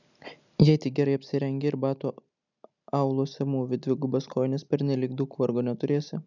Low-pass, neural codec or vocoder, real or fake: 7.2 kHz; none; real